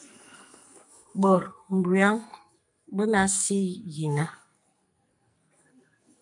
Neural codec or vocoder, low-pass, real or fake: codec, 44.1 kHz, 2.6 kbps, SNAC; 10.8 kHz; fake